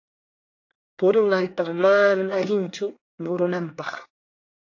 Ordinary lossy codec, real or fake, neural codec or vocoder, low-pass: AAC, 48 kbps; fake; codec, 24 kHz, 1 kbps, SNAC; 7.2 kHz